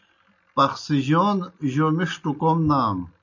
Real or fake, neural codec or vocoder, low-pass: real; none; 7.2 kHz